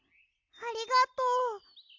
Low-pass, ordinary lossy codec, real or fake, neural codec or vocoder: 7.2 kHz; MP3, 64 kbps; real; none